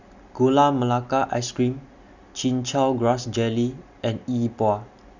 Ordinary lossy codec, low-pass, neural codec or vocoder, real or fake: none; 7.2 kHz; none; real